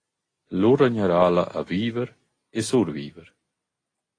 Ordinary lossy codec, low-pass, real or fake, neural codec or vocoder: AAC, 32 kbps; 9.9 kHz; real; none